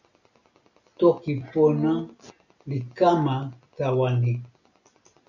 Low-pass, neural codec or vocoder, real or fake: 7.2 kHz; none; real